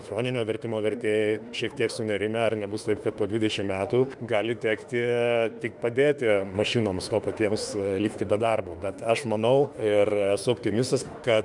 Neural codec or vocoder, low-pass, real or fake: autoencoder, 48 kHz, 32 numbers a frame, DAC-VAE, trained on Japanese speech; 10.8 kHz; fake